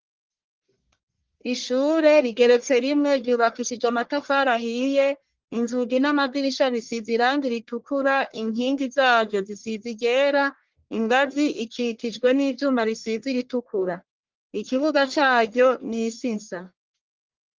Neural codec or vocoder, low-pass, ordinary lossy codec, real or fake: codec, 44.1 kHz, 1.7 kbps, Pupu-Codec; 7.2 kHz; Opus, 16 kbps; fake